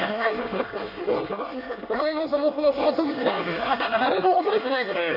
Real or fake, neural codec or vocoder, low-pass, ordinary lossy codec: fake; codec, 24 kHz, 1 kbps, SNAC; 5.4 kHz; none